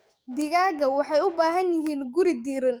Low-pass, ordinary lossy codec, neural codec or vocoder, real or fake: none; none; codec, 44.1 kHz, 7.8 kbps, DAC; fake